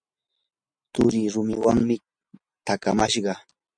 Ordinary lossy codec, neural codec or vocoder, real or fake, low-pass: MP3, 64 kbps; none; real; 9.9 kHz